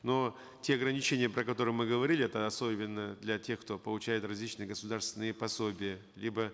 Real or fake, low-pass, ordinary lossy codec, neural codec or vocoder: real; none; none; none